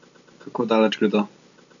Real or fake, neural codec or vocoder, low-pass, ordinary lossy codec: real; none; 7.2 kHz; none